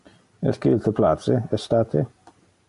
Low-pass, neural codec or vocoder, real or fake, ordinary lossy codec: 10.8 kHz; none; real; MP3, 64 kbps